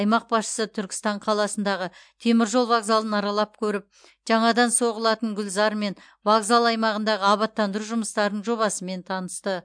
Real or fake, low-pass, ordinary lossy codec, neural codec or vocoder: real; 9.9 kHz; MP3, 64 kbps; none